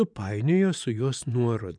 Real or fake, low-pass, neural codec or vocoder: fake; 9.9 kHz; vocoder, 24 kHz, 100 mel bands, Vocos